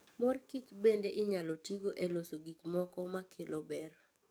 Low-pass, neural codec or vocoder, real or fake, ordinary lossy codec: none; codec, 44.1 kHz, 7.8 kbps, DAC; fake; none